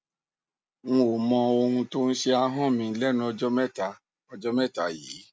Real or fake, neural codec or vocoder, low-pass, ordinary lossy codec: real; none; none; none